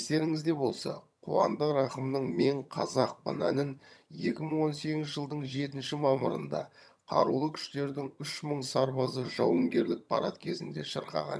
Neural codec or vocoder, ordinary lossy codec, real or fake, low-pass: vocoder, 22.05 kHz, 80 mel bands, HiFi-GAN; none; fake; none